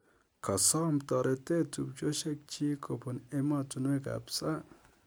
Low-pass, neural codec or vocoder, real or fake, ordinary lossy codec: none; none; real; none